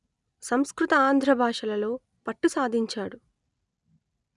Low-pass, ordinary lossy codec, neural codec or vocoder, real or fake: 10.8 kHz; none; none; real